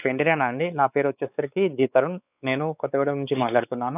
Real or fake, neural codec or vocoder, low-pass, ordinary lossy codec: fake; codec, 16 kHz, 4 kbps, X-Codec, WavLM features, trained on Multilingual LibriSpeech; 3.6 kHz; none